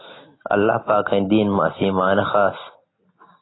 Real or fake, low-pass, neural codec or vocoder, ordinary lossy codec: real; 7.2 kHz; none; AAC, 16 kbps